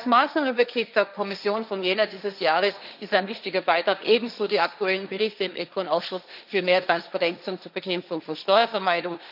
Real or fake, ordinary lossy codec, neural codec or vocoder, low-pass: fake; none; codec, 16 kHz, 1.1 kbps, Voila-Tokenizer; 5.4 kHz